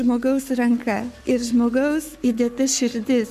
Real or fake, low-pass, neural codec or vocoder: fake; 14.4 kHz; codec, 44.1 kHz, 7.8 kbps, Pupu-Codec